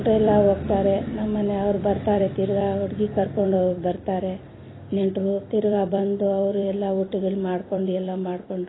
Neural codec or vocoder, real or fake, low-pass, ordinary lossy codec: vocoder, 44.1 kHz, 128 mel bands every 256 samples, BigVGAN v2; fake; 7.2 kHz; AAC, 16 kbps